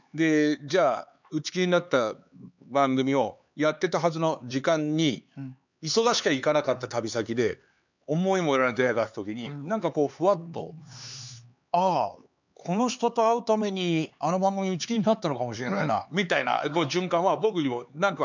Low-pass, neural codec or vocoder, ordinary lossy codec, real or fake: 7.2 kHz; codec, 16 kHz, 4 kbps, X-Codec, HuBERT features, trained on LibriSpeech; none; fake